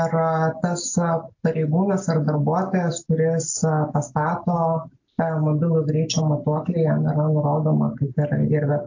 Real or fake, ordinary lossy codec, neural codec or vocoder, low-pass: real; AAC, 48 kbps; none; 7.2 kHz